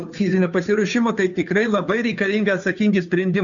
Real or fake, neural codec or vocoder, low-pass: fake; codec, 16 kHz, 2 kbps, FunCodec, trained on Chinese and English, 25 frames a second; 7.2 kHz